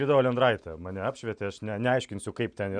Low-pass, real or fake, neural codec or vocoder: 9.9 kHz; real; none